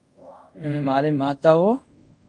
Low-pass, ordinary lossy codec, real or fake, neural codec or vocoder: 10.8 kHz; Opus, 32 kbps; fake; codec, 24 kHz, 0.5 kbps, DualCodec